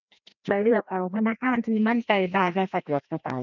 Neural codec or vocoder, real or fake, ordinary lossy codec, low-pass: codec, 16 kHz, 1 kbps, FreqCodec, larger model; fake; none; 7.2 kHz